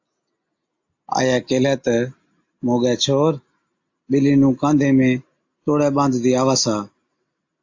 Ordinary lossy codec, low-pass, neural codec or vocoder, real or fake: AAC, 48 kbps; 7.2 kHz; none; real